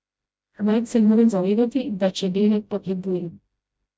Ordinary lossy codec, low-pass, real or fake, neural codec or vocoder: none; none; fake; codec, 16 kHz, 0.5 kbps, FreqCodec, smaller model